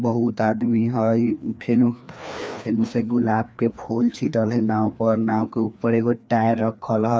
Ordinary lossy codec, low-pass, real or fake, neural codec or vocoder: none; none; fake; codec, 16 kHz, 2 kbps, FreqCodec, larger model